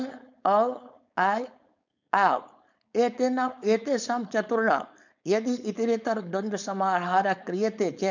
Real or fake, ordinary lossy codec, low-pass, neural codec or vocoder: fake; none; 7.2 kHz; codec, 16 kHz, 4.8 kbps, FACodec